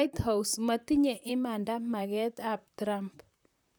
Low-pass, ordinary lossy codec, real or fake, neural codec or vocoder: none; none; fake; vocoder, 44.1 kHz, 128 mel bands, Pupu-Vocoder